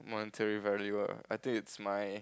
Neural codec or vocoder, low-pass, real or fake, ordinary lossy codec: none; none; real; none